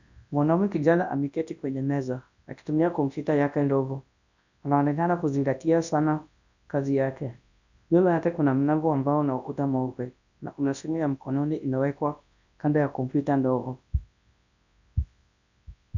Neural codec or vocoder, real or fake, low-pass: codec, 24 kHz, 0.9 kbps, WavTokenizer, large speech release; fake; 7.2 kHz